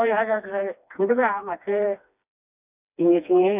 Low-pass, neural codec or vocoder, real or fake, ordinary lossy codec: 3.6 kHz; codec, 16 kHz, 2 kbps, FreqCodec, smaller model; fake; none